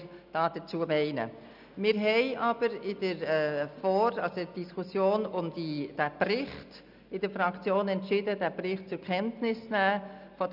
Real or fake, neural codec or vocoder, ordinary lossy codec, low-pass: real; none; none; 5.4 kHz